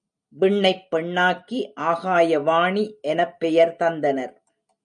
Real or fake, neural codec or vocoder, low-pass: real; none; 9.9 kHz